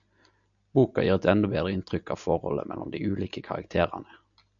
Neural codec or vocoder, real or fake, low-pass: none; real; 7.2 kHz